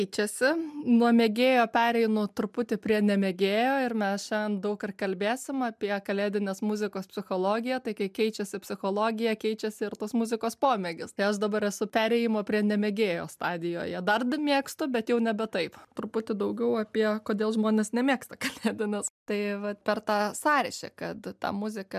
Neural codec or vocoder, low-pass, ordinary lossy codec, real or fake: none; 14.4 kHz; MP3, 96 kbps; real